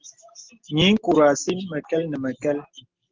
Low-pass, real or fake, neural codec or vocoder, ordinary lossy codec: 7.2 kHz; real; none; Opus, 16 kbps